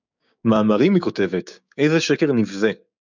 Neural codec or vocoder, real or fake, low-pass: codec, 16 kHz, 6 kbps, DAC; fake; 7.2 kHz